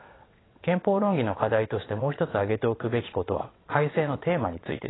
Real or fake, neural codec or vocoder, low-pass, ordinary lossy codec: fake; vocoder, 22.05 kHz, 80 mel bands, WaveNeXt; 7.2 kHz; AAC, 16 kbps